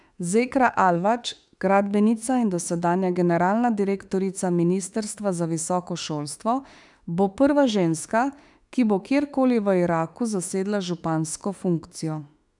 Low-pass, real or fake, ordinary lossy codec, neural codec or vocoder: 10.8 kHz; fake; none; autoencoder, 48 kHz, 32 numbers a frame, DAC-VAE, trained on Japanese speech